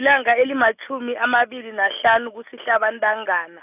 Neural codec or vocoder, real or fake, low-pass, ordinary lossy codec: none; real; 3.6 kHz; none